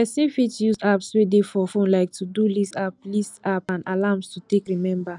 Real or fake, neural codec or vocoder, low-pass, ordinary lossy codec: real; none; 10.8 kHz; none